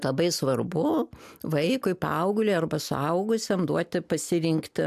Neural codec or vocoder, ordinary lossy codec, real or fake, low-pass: none; AAC, 96 kbps; real; 14.4 kHz